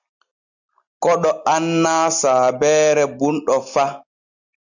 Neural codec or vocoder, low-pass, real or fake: none; 7.2 kHz; real